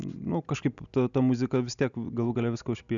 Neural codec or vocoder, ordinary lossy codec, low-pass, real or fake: none; MP3, 96 kbps; 7.2 kHz; real